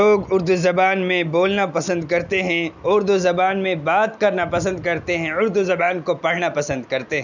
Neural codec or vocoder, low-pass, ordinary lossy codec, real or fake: none; 7.2 kHz; none; real